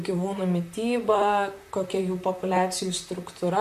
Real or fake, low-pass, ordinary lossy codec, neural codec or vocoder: fake; 14.4 kHz; MP3, 64 kbps; vocoder, 44.1 kHz, 128 mel bands, Pupu-Vocoder